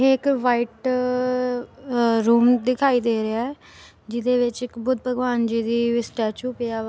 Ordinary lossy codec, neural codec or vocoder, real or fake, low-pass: none; none; real; none